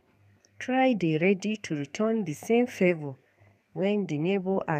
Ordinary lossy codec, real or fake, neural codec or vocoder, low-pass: none; fake; codec, 32 kHz, 1.9 kbps, SNAC; 14.4 kHz